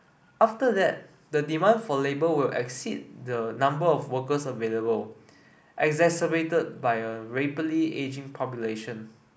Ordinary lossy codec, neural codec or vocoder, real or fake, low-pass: none; none; real; none